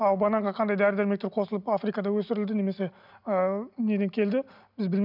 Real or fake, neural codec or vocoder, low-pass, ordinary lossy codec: real; none; 5.4 kHz; none